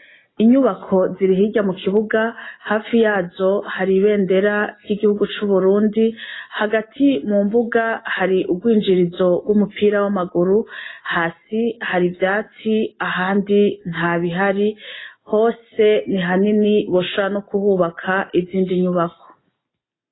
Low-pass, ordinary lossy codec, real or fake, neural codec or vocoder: 7.2 kHz; AAC, 16 kbps; real; none